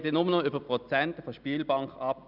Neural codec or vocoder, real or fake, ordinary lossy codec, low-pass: none; real; none; 5.4 kHz